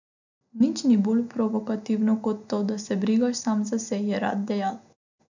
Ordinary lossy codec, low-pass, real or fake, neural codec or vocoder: none; 7.2 kHz; real; none